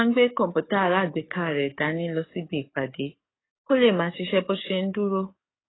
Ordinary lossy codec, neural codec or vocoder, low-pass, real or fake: AAC, 16 kbps; codec, 44.1 kHz, 7.8 kbps, DAC; 7.2 kHz; fake